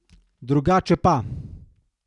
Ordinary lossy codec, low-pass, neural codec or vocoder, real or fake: none; 10.8 kHz; none; real